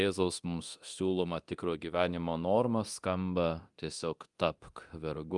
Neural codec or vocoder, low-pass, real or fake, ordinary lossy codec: codec, 24 kHz, 0.9 kbps, DualCodec; 10.8 kHz; fake; Opus, 32 kbps